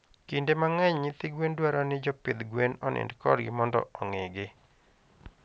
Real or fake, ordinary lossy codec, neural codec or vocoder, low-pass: real; none; none; none